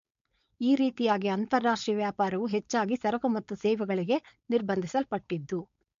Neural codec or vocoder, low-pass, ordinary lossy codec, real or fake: codec, 16 kHz, 4.8 kbps, FACodec; 7.2 kHz; MP3, 48 kbps; fake